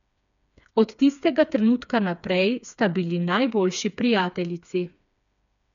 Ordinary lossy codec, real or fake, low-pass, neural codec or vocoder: none; fake; 7.2 kHz; codec, 16 kHz, 4 kbps, FreqCodec, smaller model